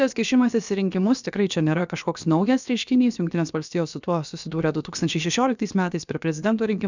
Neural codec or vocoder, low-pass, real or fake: codec, 16 kHz, about 1 kbps, DyCAST, with the encoder's durations; 7.2 kHz; fake